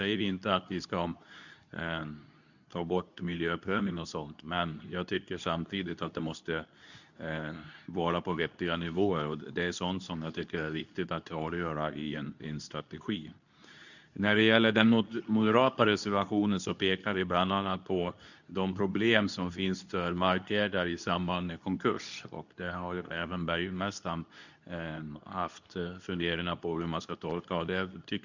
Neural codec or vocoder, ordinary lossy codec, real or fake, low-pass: codec, 24 kHz, 0.9 kbps, WavTokenizer, medium speech release version 2; none; fake; 7.2 kHz